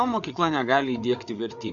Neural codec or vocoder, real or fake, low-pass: codec, 16 kHz, 8 kbps, FreqCodec, larger model; fake; 7.2 kHz